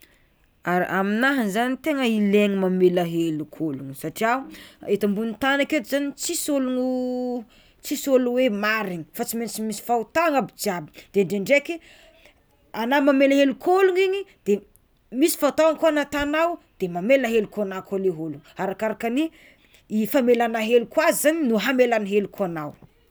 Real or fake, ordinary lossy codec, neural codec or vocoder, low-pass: real; none; none; none